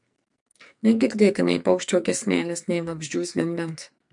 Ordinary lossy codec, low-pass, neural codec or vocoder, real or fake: MP3, 64 kbps; 10.8 kHz; codec, 44.1 kHz, 2.6 kbps, SNAC; fake